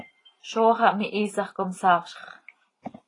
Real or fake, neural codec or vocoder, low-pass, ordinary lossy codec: real; none; 10.8 kHz; AAC, 32 kbps